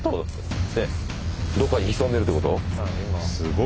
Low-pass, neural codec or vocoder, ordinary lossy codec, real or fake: none; none; none; real